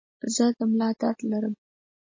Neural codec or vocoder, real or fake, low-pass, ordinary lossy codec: none; real; 7.2 kHz; MP3, 32 kbps